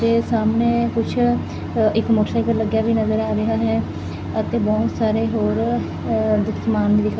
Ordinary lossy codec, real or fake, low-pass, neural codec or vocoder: none; real; none; none